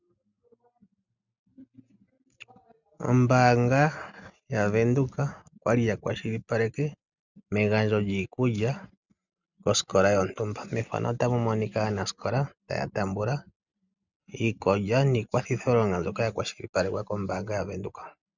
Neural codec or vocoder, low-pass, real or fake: none; 7.2 kHz; real